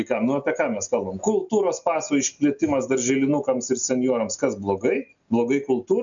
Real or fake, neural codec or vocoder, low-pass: real; none; 7.2 kHz